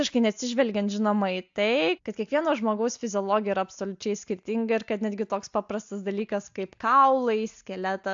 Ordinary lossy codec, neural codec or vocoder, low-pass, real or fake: AAC, 64 kbps; none; 7.2 kHz; real